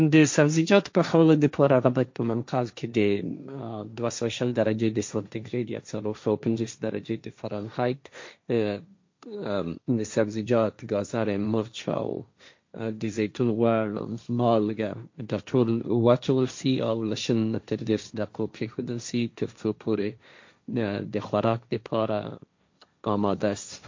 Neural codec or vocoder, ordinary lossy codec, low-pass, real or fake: codec, 16 kHz, 1.1 kbps, Voila-Tokenizer; MP3, 48 kbps; 7.2 kHz; fake